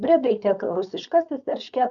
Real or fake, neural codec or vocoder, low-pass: fake; codec, 16 kHz, 4.8 kbps, FACodec; 7.2 kHz